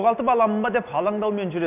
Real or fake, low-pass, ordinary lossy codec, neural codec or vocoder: real; 3.6 kHz; none; none